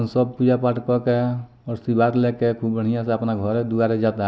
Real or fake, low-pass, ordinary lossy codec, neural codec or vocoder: real; none; none; none